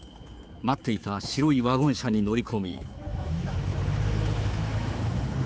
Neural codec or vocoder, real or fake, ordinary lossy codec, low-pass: codec, 16 kHz, 4 kbps, X-Codec, HuBERT features, trained on general audio; fake; none; none